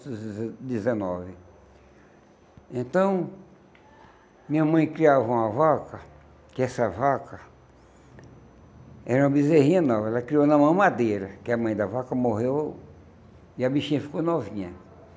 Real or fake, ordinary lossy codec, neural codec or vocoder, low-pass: real; none; none; none